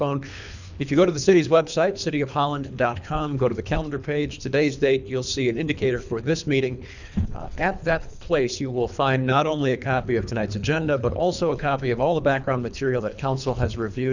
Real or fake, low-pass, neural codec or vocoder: fake; 7.2 kHz; codec, 24 kHz, 3 kbps, HILCodec